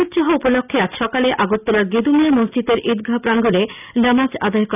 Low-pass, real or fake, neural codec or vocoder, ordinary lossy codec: 3.6 kHz; real; none; none